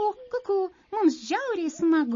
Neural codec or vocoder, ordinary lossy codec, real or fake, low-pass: none; MP3, 32 kbps; real; 7.2 kHz